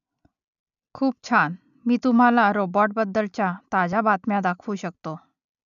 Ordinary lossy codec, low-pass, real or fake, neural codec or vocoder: none; 7.2 kHz; real; none